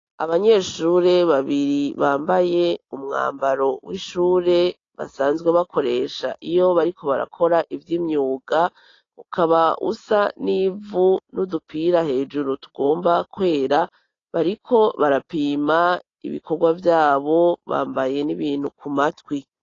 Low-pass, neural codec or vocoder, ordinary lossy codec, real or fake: 7.2 kHz; none; AAC, 32 kbps; real